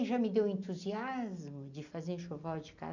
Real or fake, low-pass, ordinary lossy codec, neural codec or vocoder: real; 7.2 kHz; none; none